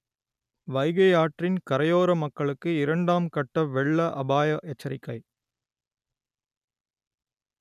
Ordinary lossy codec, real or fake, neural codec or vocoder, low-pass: none; real; none; 14.4 kHz